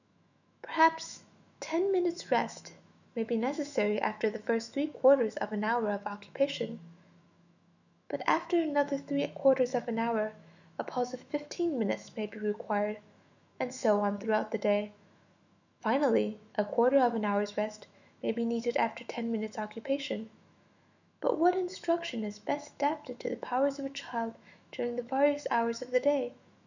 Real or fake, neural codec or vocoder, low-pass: fake; autoencoder, 48 kHz, 128 numbers a frame, DAC-VAE, trained on Japanese speech; 7.2 kHz